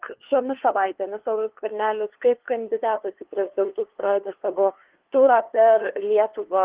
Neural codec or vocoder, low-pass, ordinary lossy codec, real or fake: codec, 16 kHz, 2 kbps, FunCodec, trained on LibriTTS, 25 frames a second; 3.6 kHz; Opus, 16 kbps; fake